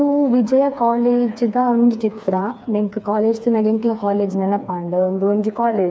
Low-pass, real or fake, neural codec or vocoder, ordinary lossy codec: none; fake; codec, 16 kHz, 4 kbps, FreqCodec, smaller model; none